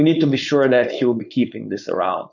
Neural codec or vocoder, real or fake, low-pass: codec, 16 kHz, 4.8 kbps, FACodec; fake; 7.2 kHz